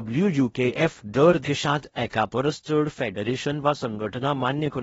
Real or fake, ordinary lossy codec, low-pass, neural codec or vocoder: fake; AAC, 24 kbps; 10.8 kHz; codec, 16 kHz in and 24 kHz out, 0.6 kbps, FocalCodec, streaming, 4096 codes